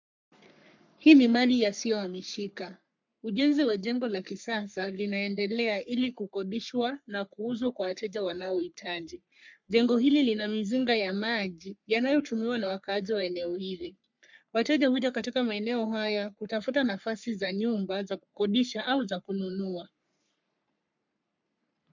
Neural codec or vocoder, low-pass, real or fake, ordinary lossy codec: codec, 44.1 kHz, 3.4 kbps, Pupu-Codec; 7.2 kHz; fake; MP3, 64 kbps